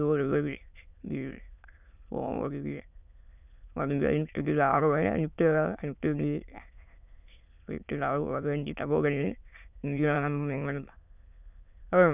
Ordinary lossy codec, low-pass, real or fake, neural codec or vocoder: none; 3.6 kHz; fake; autoencoder, 22.05 kHz, a latent of 192 numbers a frame, VITS, trained on many speakers